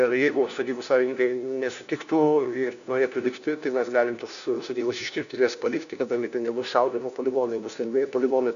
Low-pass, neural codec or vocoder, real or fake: 7.2 kHz; codec, 16 kHz, 1 kbps, FunCodec, trained on LibriTTS, 50 frames a second; fake